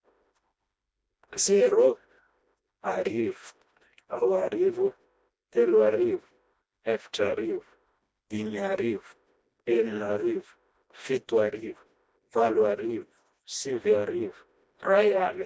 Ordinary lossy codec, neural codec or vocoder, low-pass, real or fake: none; codec, 16 kHz, 1 kbps, FreqCodec, smaller model; none; fake